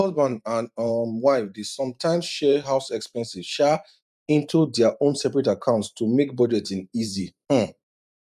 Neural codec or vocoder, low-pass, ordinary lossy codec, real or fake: vocoder, 44.1 kHz, 128 mel bands every 512 samples, BigVGAN v2; 14.4 kHz; none; fake